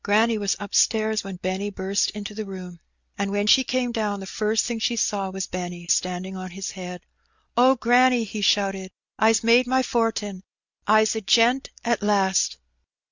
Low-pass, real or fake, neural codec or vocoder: 7.2 kHz; real; none